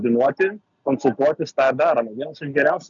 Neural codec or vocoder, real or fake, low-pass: none; real; 7.2 kHz